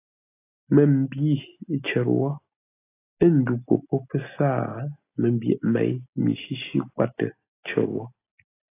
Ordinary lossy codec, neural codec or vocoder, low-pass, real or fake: AAC, 24 kbps; none; 3.6 kHz; real